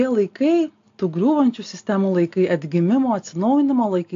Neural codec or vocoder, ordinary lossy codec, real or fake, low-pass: none; AAC, 48 kbps; real; 7.2 kHz